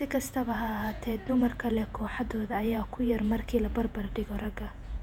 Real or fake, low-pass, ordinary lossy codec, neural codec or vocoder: fake; 19.8 kHz; none; vocoder, 48 kHz, 128 mel bands, Vocos